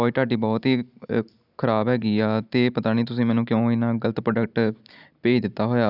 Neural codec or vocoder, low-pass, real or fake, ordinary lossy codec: none; 5.4 kHz; real; none